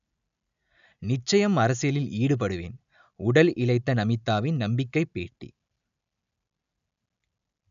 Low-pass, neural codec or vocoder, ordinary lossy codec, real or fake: 7.2 kHz; none; none; real